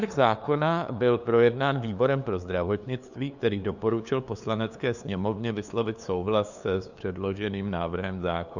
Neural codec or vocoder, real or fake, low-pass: codec, 16 kHz, 2 kbps, FunCodec, trained on LibriTTS, 25 frames a second; fake; 7.2 kHz